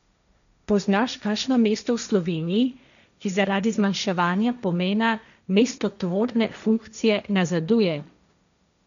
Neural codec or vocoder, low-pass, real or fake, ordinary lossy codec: codec, 16 kHz, 1.1 kbps, Voila-Tokenizer; 7.2 kHz; fake; none